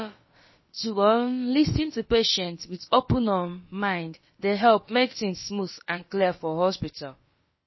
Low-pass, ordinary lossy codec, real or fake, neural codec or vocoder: 7.2 kHz; MP3, 24 kbps; fake; codec, 16 kHz, about 1 kbps, DyCAST, with the encoder's durations